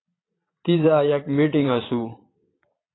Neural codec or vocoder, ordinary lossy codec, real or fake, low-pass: vocoder, 22.05 kHz, 80 mel bands, Vocos; AAC, 16 kbps; fake; 7.2 kHz